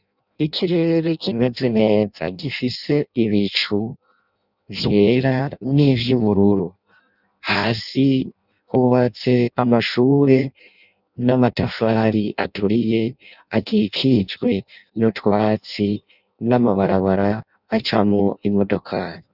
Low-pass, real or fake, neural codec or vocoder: 5.4 kHz; fake; codec, 16 kHz in and 24 kHz out, 0.6 kbps, FireRedTTS-2 codec